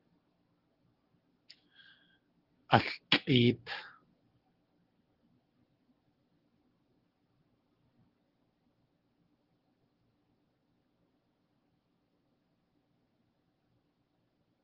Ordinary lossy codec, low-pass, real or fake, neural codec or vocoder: Opus, 16 kbps; 5.4 kHz; real; none